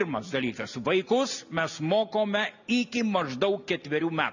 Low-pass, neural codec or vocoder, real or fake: 7.2 kHz; none; real